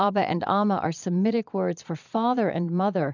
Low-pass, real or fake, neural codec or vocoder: 7.2 kHz; fake; vocoder, 22.05 kHz, 80 mel bands, Vocos